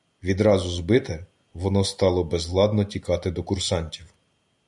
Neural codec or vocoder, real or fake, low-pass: none; real; 10.8 kHz